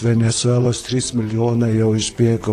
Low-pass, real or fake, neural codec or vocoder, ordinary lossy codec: 14.4 kHz; fake; vocoder, 44.1 kHz, 128 mel bands, Pupu-Vocoder; AAC, 48 kbps